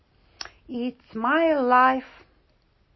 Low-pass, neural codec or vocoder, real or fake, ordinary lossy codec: 7.2 kHz; none; real; MP3, 24 kbps